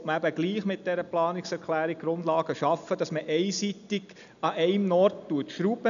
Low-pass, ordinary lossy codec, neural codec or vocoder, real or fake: 7.2 kHz; none; none; real